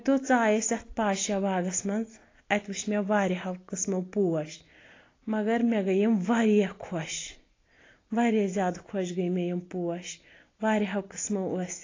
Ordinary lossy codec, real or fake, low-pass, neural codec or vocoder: AAC, 32 kbps; real; 7.2 kHz; none